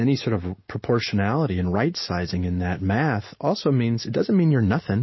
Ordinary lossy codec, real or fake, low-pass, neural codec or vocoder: MP3, 24 kbps; real; 7.2 kHz; none